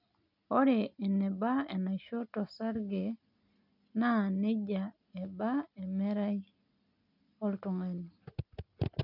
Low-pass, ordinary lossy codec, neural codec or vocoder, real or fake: 5.4 kHz; none; none; real